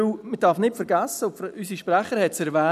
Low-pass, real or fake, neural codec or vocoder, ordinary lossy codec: 14.4 kHz; real; none; none